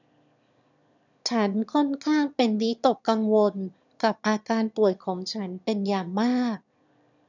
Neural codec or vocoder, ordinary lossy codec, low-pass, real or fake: autoencoder, 22.05 kHz, a latent of 192 numbers a frame, VITS, trained on one speaker; none; 7.2 kHz; fake